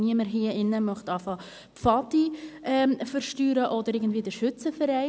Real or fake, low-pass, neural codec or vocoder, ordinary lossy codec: real; none; none; none